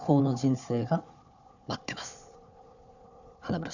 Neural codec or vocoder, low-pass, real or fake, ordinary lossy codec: codec, 16 kHz, 4 kbps, FunCodec, trained on Chinese and English, 50 frames a second; 7.2 kHz; fake; none